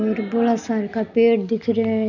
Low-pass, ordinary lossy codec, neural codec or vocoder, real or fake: 7.2 kHz; none; none; real